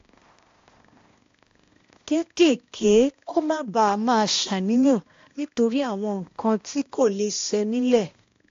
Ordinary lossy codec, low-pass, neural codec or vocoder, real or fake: AAC, 48 kbps; 7.2 kHz; codec, 16 kHz, 1 kbps, X-Codec, HuBERT features, trained on balanced general audio; fake